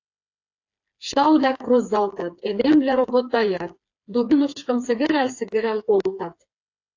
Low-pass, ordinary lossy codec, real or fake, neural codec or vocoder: 7.2 kHz; AAC, 48 kbps; fake; codec, 16 kHz, 4 kbps, FreqCodec, smaller model